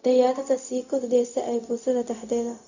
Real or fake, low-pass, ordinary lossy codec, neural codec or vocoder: fake; 7.2 kHz; none; codec, 16 kHz, 0.4 kbps, LongCat-Audio-Codec